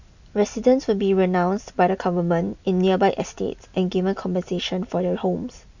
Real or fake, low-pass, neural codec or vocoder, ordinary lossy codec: real; 7.2 kHz; none; none